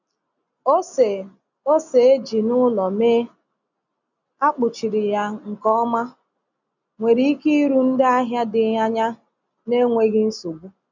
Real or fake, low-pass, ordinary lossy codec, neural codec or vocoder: real; 7.2 kHz; none; none